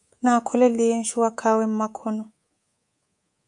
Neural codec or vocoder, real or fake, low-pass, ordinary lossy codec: codec, 24 kHz, 3.1 kbps, DualCodec; fake; 10.8 kHz; AAC, 64 kbps